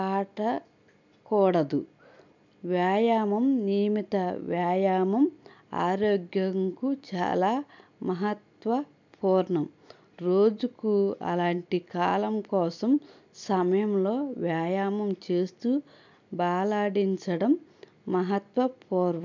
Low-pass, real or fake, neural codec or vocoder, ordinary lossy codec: 7.2 kHz; real; none; MP3, 64 kbps